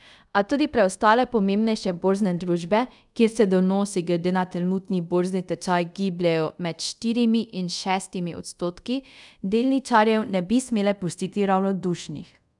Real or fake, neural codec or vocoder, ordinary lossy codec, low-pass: fake; codec, 24 kHz, 0.5 kbps, DualCodec; none; 10.8 kHz